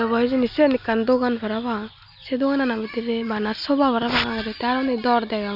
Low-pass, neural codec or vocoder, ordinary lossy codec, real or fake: 5.4 kHz; none; none; real